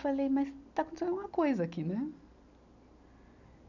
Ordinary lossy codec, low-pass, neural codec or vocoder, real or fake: none; 7.2 kHz; none; real